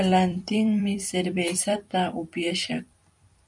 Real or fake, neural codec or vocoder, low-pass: fake; vocoder, 44.1 kHz, 128 mel bands every 256 samples, BigVGAN v2; 10.8 kHz